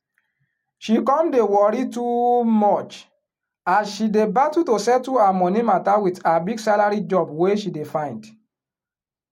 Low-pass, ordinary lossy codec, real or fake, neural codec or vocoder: 19.8 kHz; MP3, 64 kbps; real; none